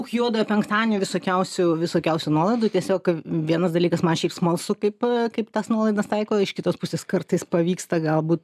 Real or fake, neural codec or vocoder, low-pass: real; none; 14.4 kHz